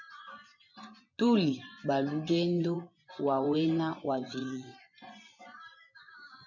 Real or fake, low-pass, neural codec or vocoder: fake; 7.2 kHz; vocoder, 24 kHz, 100 mel bands, Vocos